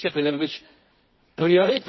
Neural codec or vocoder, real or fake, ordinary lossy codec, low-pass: codec, 24 kHz, 0.9 kbps, WavTokenizer, medium music audio release; fake; MP3, 24 kbps; 7.2 kHz